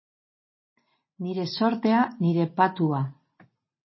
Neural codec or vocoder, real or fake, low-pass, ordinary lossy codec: none; real; 7.2 kHz; MP3, 24 kbps